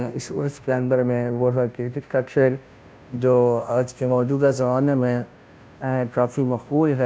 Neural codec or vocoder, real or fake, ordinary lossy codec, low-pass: codec, 16 kHz, 0.5 kbps, FunCodec, trained on Chinese and English, 25 frames a second; fake; none; none